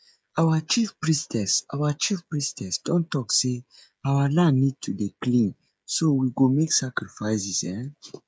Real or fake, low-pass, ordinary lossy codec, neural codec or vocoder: fake; none; none; codec, 16 kHz, 16 kbps, FreqCodec, smaller model